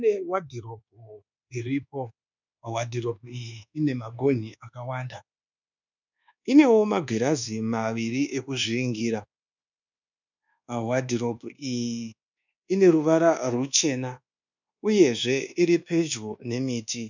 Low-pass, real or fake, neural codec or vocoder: 7.2 kHz; fake; codec, 24 kHz, 1.2 kbps, DualCodec